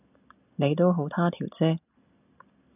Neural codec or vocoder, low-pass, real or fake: none; 3.6 kHz; real